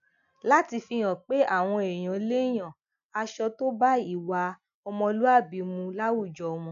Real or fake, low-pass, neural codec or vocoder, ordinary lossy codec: real; 7.2 kHz; none; none